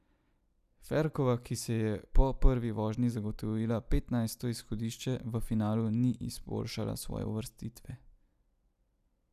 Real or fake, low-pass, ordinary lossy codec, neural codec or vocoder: real; 14.4 kHz; none; none